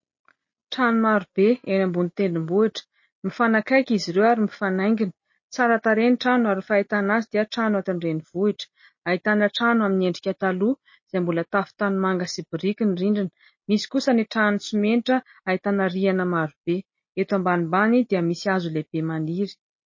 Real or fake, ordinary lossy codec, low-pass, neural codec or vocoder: real; MP3, 32 kbps; 7.2 kHz; none